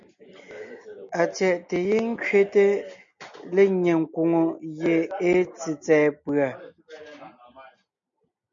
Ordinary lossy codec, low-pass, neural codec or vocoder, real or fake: MP3, 64 kbps; 7.2 kHz; none; real